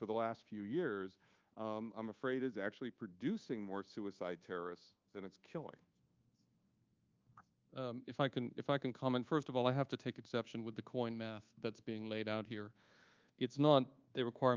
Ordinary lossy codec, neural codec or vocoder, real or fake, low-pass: Opus, 24 kbps; codec, 24 kHz, 1.2 kbps, DualCodec; fake; 7.2 kHz